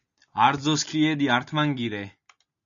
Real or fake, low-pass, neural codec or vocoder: real; 7.2 kHz; none